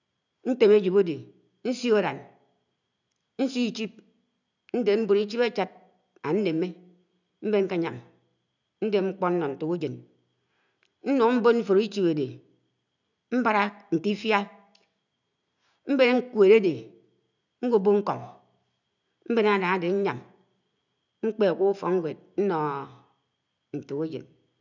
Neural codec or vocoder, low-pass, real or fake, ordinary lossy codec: none; 7.2 kHz; real; none